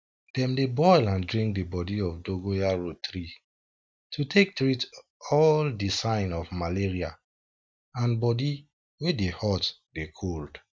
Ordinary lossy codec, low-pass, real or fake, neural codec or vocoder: none; none; real; none